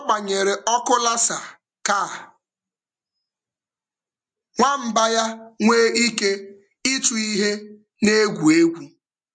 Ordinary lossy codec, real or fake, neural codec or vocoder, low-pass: MP3, 64 kbps; real; none; 9.9 kHz